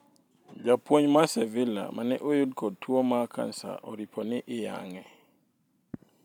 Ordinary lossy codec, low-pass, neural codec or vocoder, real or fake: none; 19.8 kHz; vocoder, 44.1 kHz, 128 mel bands every 512 samples, BigVGAN v2; fake